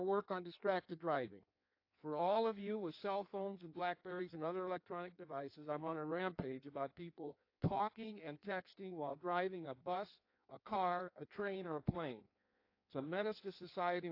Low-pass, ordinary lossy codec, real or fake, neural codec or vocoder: 5.4 kHz; AAC, 32 kbps; fake; codec, 16 kHz in and 24 kHz out, 1.1 kbps, FireRedTTS-2 codec